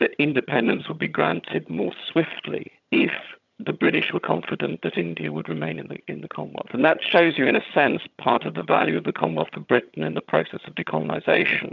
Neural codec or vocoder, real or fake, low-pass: vocoder, 22.05 kHz, 80 mel bands, HiFi-GAN; fake; 7.2 kHz